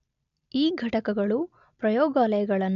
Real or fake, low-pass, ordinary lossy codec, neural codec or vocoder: real; 7.2 kHz; none; none